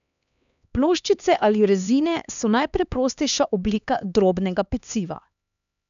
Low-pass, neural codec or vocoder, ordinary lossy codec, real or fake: 7.2 kHz; codec, 16 kHz, 2 kbps, X-Codec, HuBERT features, trained on LibriSpeech; none; fake